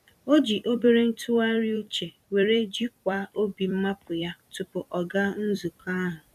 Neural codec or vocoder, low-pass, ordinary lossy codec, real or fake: vocoder, 44.1 kHz, 128 mel bands every 256 samples, BigVGAN v2; 14.4 kHz; none; fake